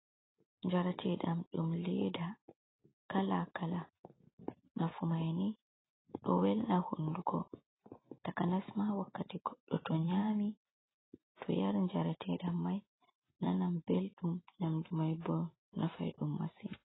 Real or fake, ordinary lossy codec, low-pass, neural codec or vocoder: real; AAC, 16 kbps; 7.2 kHz; none